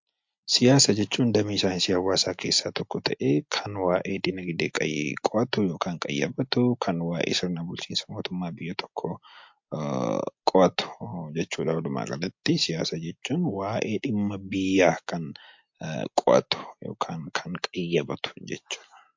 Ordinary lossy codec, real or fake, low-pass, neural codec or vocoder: MP3, 48 kbps; real; 7.2 kHz; none